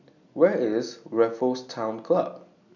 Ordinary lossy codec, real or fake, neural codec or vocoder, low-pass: none; real; none; 7.2 kHz